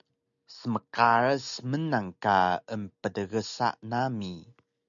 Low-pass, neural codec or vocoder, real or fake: 7.2 kHz; none; real